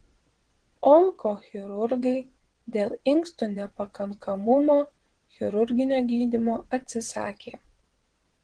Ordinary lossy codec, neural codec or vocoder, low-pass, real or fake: Opus, 16 kbps; vocoder, 22.05 kHz, 80 mel bands, WaveNeXt; 9.9 kHz; fake